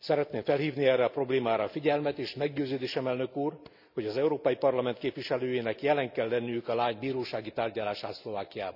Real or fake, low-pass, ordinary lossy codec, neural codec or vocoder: real; 5.4 kHz; none; none